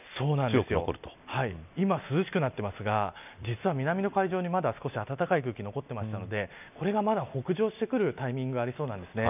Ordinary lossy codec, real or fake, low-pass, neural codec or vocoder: none; real; 3.6 kHz; none